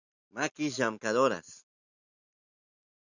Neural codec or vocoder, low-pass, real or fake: none; 7.2 kHz; real